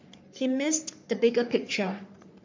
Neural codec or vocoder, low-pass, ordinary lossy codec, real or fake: codec, 44.1 kHz, 3.4 kbps, Pupu-Codec; 7.2 kHz; MP3, 48 kbps; fake